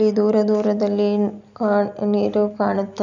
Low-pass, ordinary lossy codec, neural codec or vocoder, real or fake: 7.2 kHz; none; none; real